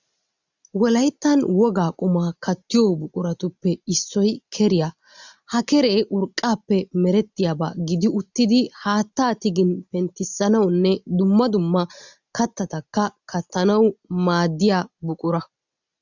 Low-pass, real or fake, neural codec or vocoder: 7.2 kHz; real; none